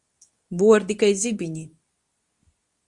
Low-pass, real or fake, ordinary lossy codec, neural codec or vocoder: 10.8 kHz; fake; Opus, 64 kbps; codec, 24 kHz, 0.9 kbps, WavTokenizer, medium speech release version 2